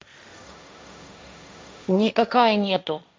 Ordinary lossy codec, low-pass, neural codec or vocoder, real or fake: none; none; codec, 16 kHz, 1.1 kbps, Voila-Tokenizer; fake